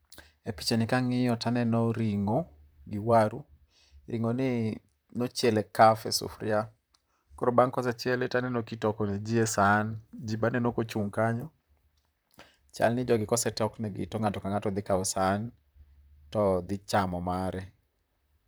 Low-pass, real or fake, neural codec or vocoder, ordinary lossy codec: none; fake; vocoder, 44.1 kHz, 128 mel bands, Pupu-Vocoder; none